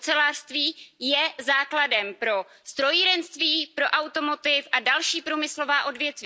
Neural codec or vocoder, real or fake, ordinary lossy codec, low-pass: none; real; none; none